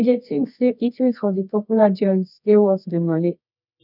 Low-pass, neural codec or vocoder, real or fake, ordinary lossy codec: 5.4 kHz; codec, 24 kHz, 0.9 kbps, WavTokenizer, medium music audio release; fake; none